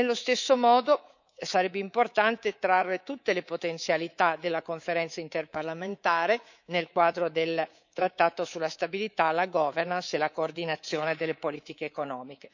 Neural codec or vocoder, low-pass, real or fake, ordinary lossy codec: codec, 24 kHz, 3.1 kbps, DualCodec; 7.2 kHz; fake; none